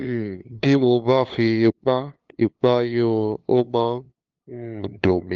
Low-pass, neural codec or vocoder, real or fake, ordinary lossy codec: 7.2 kHz; codec, 16 kHz, 2 kbps, FunCodec, trained on LibriTTS, 25 frames a second; fake; Opus, 32 kbps